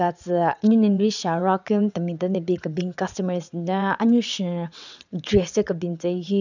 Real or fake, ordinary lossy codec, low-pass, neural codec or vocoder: fake; none; 7.2 kHz; vocoder, 22.05 kHz, 80 mel bands, WaveNeXt